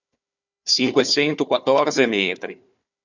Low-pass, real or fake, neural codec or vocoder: 7.2 kHz; fake; codec, 16 kHz, 4 kbps, FunCodec, trained on Chinese and English, 50 frames a second